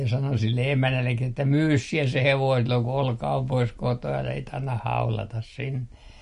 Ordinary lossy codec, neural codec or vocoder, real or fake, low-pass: MP3, 48 kbps; none; real; 10.8 kHz